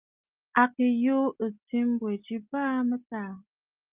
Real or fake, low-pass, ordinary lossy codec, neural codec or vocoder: real; 3.6 kHz; Opus, 24 kbps; none